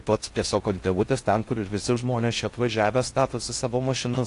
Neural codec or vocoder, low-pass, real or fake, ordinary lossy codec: codec, 16 kHz in and 24 kHz out, 0.6 kbps, FocalCodec, streaming, 4096 codes; 10.8 kHz; fake; AAC, 48 kbps